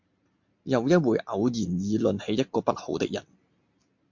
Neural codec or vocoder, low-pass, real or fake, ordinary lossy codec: none; 7.2 kHz; real; MP3, 48 kbps